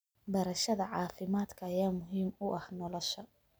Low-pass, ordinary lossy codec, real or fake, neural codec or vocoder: none; none; real; none